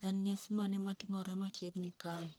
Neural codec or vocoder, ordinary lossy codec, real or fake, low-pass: codec, 44.1 kHz, 1.7 kbps, Pupu-Codec; none; fake; none